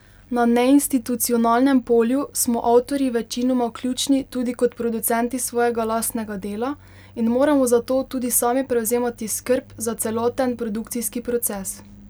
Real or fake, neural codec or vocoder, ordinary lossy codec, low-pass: real; none; none; none